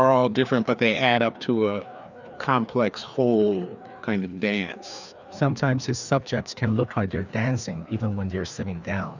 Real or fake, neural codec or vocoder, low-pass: fake; codec, 16 kHz, 2 kbps, FreqCodec, larger model; 7.2 kHz